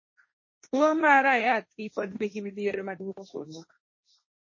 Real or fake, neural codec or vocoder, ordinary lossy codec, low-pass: fake; codec, 16 kHz, 1.1 kbps, Voila-Tokenizer; MP3, 32 kbps; 7.2 kHz